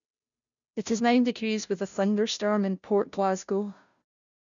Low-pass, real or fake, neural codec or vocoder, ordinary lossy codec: 7.2 kHz; fake; codec, 16 kHz, 0.5 kbps, FunCodec, trained on Chinese and English, 25 frames a second; none